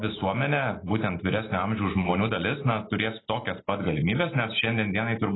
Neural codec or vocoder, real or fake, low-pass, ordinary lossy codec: none; real; 7.2 kHz; AAC, 16 kbps